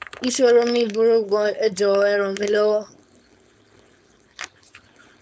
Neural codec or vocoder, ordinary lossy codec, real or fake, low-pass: codec, 16 kHz, 4.8 kbps, FACodec; none; fake; none